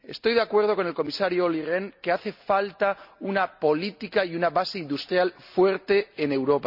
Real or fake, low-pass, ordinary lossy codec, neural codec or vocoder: real; 5.4 kHz; none; none